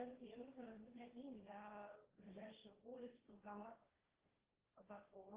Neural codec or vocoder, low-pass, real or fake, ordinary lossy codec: codec, 16 kHz, 1.1 kbps, Voila-Tokenizer; 3.6 kHz; fake; Opus, 16 kbps